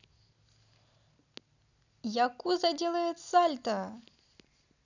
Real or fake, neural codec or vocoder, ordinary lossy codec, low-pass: real; none; none; 7.2 kHz